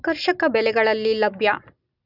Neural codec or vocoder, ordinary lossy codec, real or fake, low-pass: none; none; real; 5.4 kHz